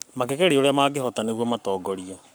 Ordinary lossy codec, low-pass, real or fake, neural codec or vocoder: none; none; fake; codec, 44.1 kHz, 7.8 kbps, Pupu-Codec